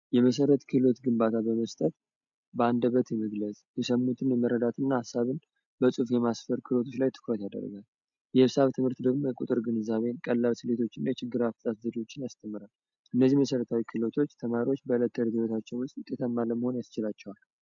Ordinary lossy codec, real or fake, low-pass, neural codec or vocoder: MP3, 64 kbps; real; 7.2 kHz; none